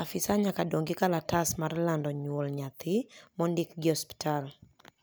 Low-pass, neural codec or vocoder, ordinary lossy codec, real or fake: none; none; none; real